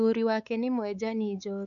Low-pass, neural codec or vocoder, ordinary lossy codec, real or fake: 7.2 kHz; codec, 16 kHz, 4 kbps, X-Codec, WavLM features, trained on Multilingual LibriSpeech; none; fake